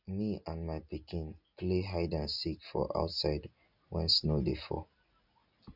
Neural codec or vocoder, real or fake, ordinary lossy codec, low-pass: none; real; none; 5.4 kHz